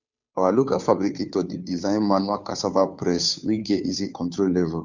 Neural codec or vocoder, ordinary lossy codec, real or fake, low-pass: codec, 16 kHz, 2 kbps, FunCodec, trained on Chinese and English, 25 frames a second; none; fake; 7.2 kHz